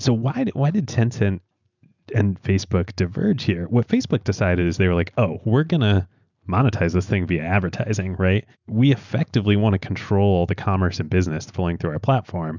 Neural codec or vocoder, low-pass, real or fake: none; 7.2 kHz; real